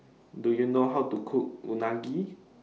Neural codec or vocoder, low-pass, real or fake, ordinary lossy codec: none; none; real; none